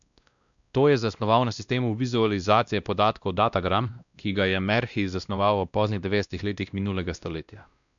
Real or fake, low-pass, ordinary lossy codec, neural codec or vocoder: fake; 7.2 kHz; none; codec, 16 kHz, 1 kbps, X-Codec, WavLM features, trained on Multilingual LibriSpeech